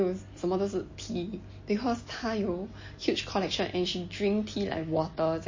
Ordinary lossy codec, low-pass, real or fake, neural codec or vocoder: MP3, 32 kbps; 7.2 kHz; real; none